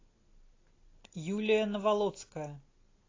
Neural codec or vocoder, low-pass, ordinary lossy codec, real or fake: none; 7.2 kHz; AAC, 32 kbps; real